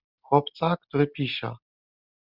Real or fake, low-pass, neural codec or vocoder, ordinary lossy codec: real; 5.4 kHz; none; Opus, 64 kbps